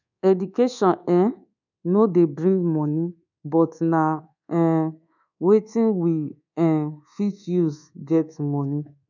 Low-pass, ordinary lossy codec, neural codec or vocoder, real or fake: 7.2 kHz; none; codec, 24 kHz, 1.2 kbps, DualCodec; fake